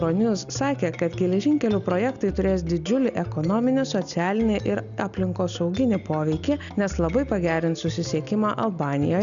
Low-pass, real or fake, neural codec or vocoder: 7.2 kHz; real; none